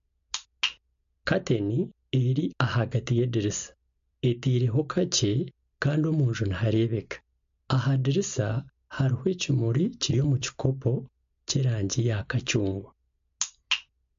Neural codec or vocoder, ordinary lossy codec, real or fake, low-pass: none; MP3, 48 kbps; real; 7.2 kHz